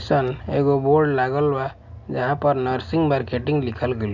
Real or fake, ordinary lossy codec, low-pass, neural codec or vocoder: real; none; 7.2 kHz; none